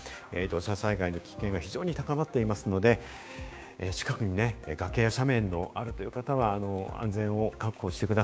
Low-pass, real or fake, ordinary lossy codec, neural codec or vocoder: none; fake; none; codec, 16 kHz, 6 kbps, DAC